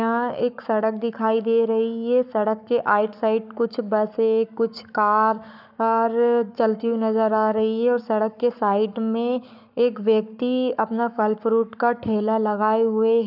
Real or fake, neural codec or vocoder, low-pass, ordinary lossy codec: fake; codec, 44.1 kHz, 7.8 kbps, Pupu-Codec; 5.4 kHz; none